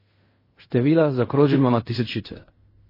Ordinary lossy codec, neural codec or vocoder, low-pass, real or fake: MP3, 24 kbps; codec, 16 kHz in and 24 kHz out, 0.4 kbps, LongCat-Audio-Codec, fine tuned four codebook decoder; 5.4 kHz; fake